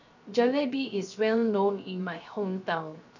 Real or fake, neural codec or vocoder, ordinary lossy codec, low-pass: fake; codec, 16 kHz, 0.3 kbps, FocalCodec; none; 7.2 kHz